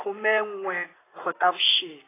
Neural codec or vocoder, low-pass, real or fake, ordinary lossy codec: vocoder, 44.1 kHz, 128 mel bands, Pupu-Vocoder; 3.6 kHz; fake; AAC, 16 kbps